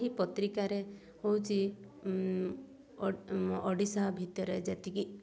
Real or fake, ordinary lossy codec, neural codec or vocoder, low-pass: real; none; none; none